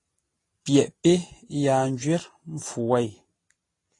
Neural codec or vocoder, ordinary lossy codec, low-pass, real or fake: none; AAC, 32 kbps; 10.8 kHz; real